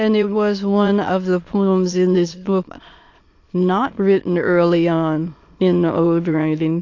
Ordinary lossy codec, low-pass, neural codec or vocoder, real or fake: AAC, 48 kbps; 7.2 kHz; autoencoder, 22.05 kHz, a latent of 192 numbers a frame, VITS, trained on many speakers; fake